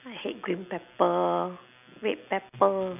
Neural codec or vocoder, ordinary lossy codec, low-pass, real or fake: none; none; 3.6 kHz; real